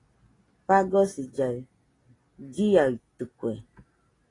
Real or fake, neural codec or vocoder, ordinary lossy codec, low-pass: real; none; AAC, 32 kbps; 10.8 kHz